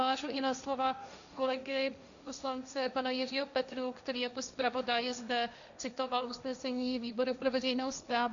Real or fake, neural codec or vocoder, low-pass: fake; codec, 16 kHz, 1.1 kbps, Voila-Tokenizer; 7.2 kHz